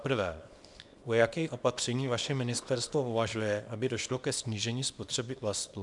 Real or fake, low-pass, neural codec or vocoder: fake; 10.8 kHz; codec, 24 kHz, 0.9 kbps, WavTokenizer, small release